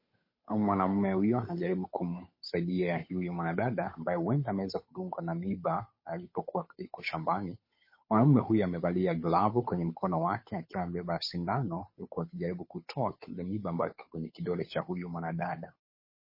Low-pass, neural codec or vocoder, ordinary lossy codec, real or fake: 5.4 kHz; codec, 16 kHz, 8 kbps, FunCodec, trained on Chinese and English, 25 frames a second; MP3, 24 kbps; fake